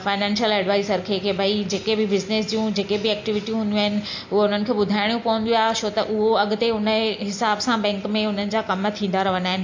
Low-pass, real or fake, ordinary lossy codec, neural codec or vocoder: 7.2 kHz; real; AAC, 48 kbps; none